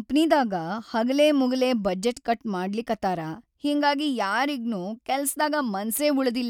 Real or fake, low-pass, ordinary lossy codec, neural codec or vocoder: real; 19.8 kHz; none; none